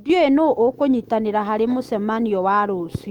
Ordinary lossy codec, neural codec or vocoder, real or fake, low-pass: Opus, 32 kbps; autoencoder, 48 kHz, 128 numbers a frame, DAC-VAE, trained on Japanese speech; fake; 19.8 kHz